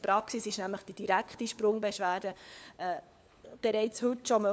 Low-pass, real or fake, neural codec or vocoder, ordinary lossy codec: none; fake; codec, 16 kHz, 4 kbps, FunCodec, trained on LibriTTS, 50 frames a second; none